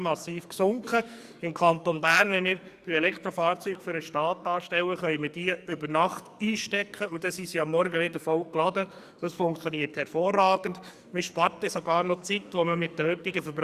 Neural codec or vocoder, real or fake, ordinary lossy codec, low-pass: codec, 44.1 kHz, 2.6 kbps, SNAC; fake; Opus, 64 kbps; 14.4 kHz